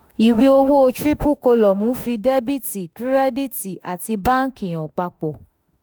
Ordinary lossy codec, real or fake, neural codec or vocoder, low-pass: none; fake; autoencoder, 48 kHz, 32 numbers a frame, DAC-VAE, trained on Japanese speech; none